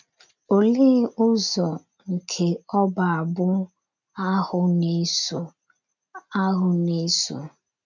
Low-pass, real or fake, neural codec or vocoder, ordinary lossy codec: 7.2 kHz; real; none; none